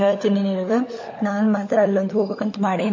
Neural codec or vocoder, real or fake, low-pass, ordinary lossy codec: codec, 16 kHz, 16 kbps, FunCodec, trained on LibriTTS, 50 frames a second; fake; 7.2 kHz; MP3, 32 kbps